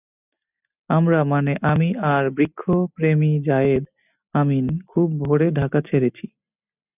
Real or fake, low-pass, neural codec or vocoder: real; 3.6 kHz; none